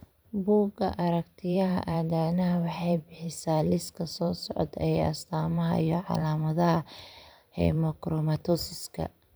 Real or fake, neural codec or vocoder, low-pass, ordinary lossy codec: fake; vocoder, 44.1 kHz, 128 mel bands every 512 samples, BigVGAN v2; none; none